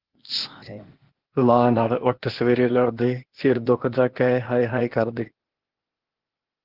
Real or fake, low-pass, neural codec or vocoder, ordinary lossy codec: fake; 5.4 kHz; codec, 16 kHz, 0.8 kbps, ZipCodec; Opus, 16 kbps